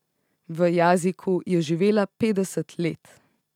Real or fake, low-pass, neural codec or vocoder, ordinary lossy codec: real; 19.8 kHz; none; none